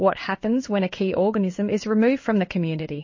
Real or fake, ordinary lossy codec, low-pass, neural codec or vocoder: fake; MP3, 32 kbps; 7.2 kHz; codec, 16 kHz in and 24 kHz out, 1 kbps, XY-Tokenizer